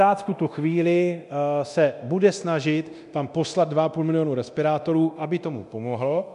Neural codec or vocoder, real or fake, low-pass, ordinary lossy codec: codec, 24 kHz, 0.9 kbps, DualCodec; fake; 10.8 kHz; MP3, 96 kbps